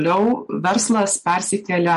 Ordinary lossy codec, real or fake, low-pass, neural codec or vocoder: MP3, 48 kbps; real; 14.4 kHz; none